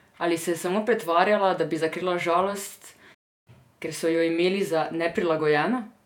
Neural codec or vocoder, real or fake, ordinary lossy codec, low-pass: vocoder, 48 kHz, 128 mel bands, Vocos; fake; none; 19.8 kHz